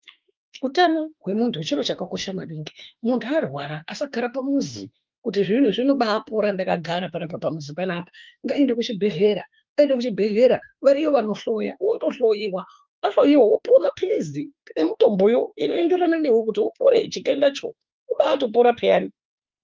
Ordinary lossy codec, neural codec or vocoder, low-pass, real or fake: Opus, 32 kbps; autoencoder, 48 kHz, 32 numbers a frame, DAC-VAE, trained on Japanese speech; 7.2 kHz; fake